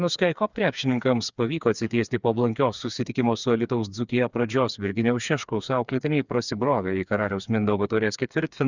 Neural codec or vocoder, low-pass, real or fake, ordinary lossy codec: codec, 16 kHz, 4 kbps, FreqCodec, smaller model; 7.2 kHz; fake; Opus, 64 kbps